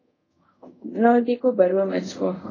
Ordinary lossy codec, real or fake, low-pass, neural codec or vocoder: AAC, 32 kbps; fake; 7.2 kHz; codec, 24 kHz, 0.5 kbps, DualCodec